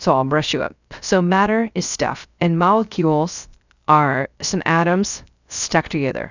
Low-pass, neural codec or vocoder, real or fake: 7.2 kHz; codec, 16 kHz, 0.3 kbps, FocalCodec; fake